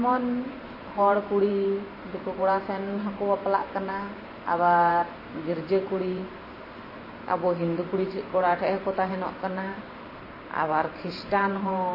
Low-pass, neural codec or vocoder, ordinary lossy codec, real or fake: 5.4 kHz; vocoder, 44.1 kHz, 128 mel bands every 512 samples, BigVGAN v2; MP3, 24 kbps; fake